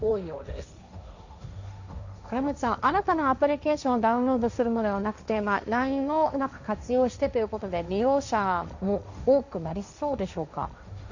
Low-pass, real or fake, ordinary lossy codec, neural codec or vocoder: 7.2 kHz; fake; none; codec, 16 kHz, 1.1 kbps, Voila-Tokenizer